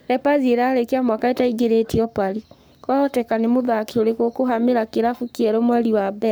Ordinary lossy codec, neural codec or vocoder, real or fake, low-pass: none; codec, 44.1 kHz, 7.8 kbps, Pupu-Codec; fake; none